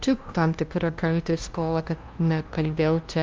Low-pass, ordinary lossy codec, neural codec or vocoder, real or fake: 7.2 kHz; Opus, 32 kbps; codec, 16 kHz, 0.5 kbps, FunCodec, trained on LibriTTS, 25 frames a second; fake